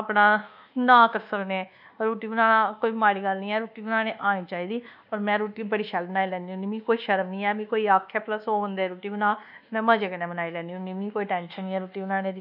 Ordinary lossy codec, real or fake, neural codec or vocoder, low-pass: none; fake; codec, 24 kHz, 1.2 kbps, DualCodec; 5.4 kHz